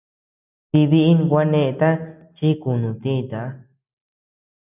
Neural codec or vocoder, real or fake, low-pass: none; real; 3.6 kHz